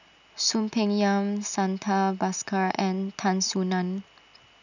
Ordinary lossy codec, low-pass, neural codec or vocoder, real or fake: none; 7.2 kHz; none; real